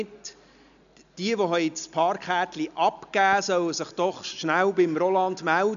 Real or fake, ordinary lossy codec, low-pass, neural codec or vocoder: real; none; 7.2 kHz; none